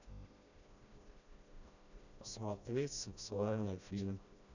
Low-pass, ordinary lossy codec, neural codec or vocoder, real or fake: 7.2 kHz; none; codec, 16 kHz, 1 kbps, FreqCodec, smaller model; fake